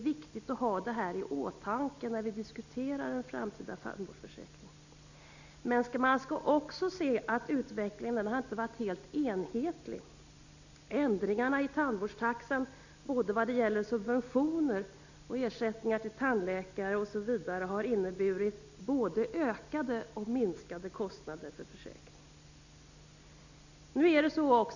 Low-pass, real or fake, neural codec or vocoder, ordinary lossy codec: 7.2 kHz; real; none; none